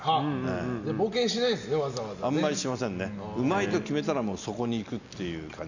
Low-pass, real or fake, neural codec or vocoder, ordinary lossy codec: 7.2 kHz; real; none; none